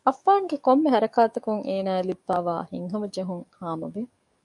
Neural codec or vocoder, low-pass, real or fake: codec, 44.1 kHz, 7.8 kbps, DAC; 10.8 kHz; fake